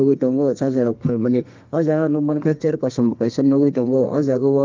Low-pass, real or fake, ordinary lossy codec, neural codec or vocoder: 7.2 kHz; fake; Opus, 24 kbps; codec, 32 kHz, 1.9 kbps, SNAC